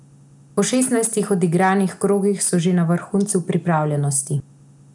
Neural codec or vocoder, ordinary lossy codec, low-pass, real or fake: none; none; 10.8 kHz; real